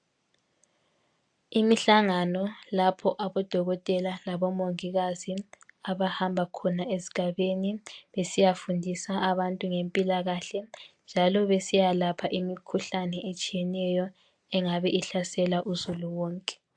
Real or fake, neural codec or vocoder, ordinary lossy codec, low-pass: real; none; AAC, 64 kbps; 9.9 kHz